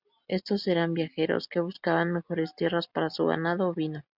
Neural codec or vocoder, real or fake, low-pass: none; real; 5.4 kHz